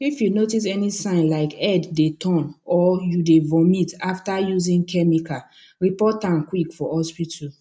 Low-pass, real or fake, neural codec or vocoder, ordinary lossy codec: none; real; none; none